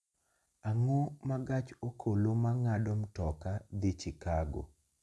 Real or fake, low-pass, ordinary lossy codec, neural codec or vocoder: real; none; none; none